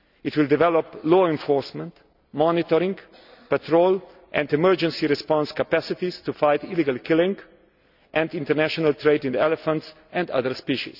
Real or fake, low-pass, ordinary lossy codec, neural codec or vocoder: real; 5.4 kHz; none; none